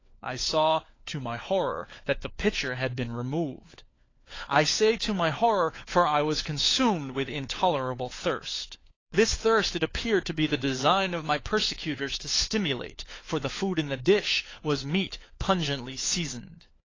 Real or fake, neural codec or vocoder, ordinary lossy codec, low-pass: fake; codec, 16 kHz, 4 kbps, FunCodec, trained on LibriTTS, 50 frames a second; AAC, 32 kbps; 7.2 kHz